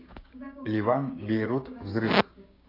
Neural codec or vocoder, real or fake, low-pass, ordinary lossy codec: codec, 16 kHz, 6 kbps, DAC; fake; 5.4 kHz; AAC, 24 kbps